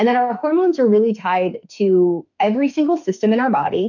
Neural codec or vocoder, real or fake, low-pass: autoencoder, 48 kHz, 32 numbers a frame, DAC-VAE, trained on Japanese speech; fake; 7.2 kHz